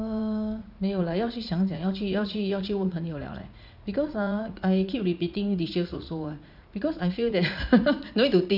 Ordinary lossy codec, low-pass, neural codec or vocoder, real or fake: none; 5.4 kHz; vocoder, 44.1 kHz, 80 mel bands, Vocos; fake